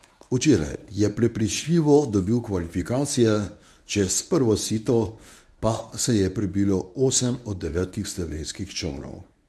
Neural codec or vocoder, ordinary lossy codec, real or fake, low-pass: codec, 24 kHz, 0.9 kbps, WavTokenizer, medium speech release version 1; none; fake; none